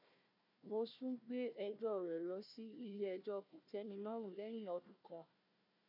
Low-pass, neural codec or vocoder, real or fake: 5.4 kHz; codec, 16 kHz, 1 kbps, FunCodec, trained on Chinese and English, 50 frames a second; fake